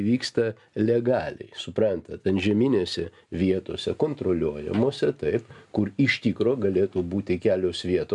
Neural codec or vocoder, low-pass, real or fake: none; 10.8 kHz; real